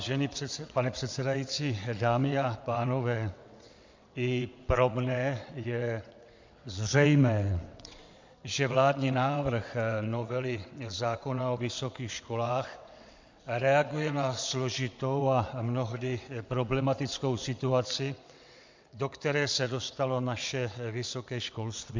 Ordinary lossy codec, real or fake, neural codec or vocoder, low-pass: MP3, 64 kbps; fake; vocoder, 22.05 kHz, 80 mel bands, WaveNeXt; 7.2 kHz